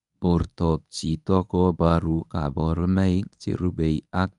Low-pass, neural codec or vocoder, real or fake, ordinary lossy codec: 10.8 kHz; codec, 24 kHz, 0.9 kbps, WavTokenizer, medium speech release version 1; fake; none